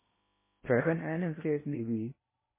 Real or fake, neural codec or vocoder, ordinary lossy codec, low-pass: fake; codec, 16 kHz in and 24 kHz out, 0.8 kbps, FocalCodec, streaming, 65536 codes; MP3, 16 kbps; 3.6 kHz